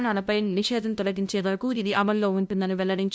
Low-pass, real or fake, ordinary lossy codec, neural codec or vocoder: none; fake; none; codec, 16 kHz, 0.5 kbps, FunCodec, trained on LibriTTS, 25 frames a second